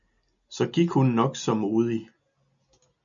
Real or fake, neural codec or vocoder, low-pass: real; none; 7.2 kHz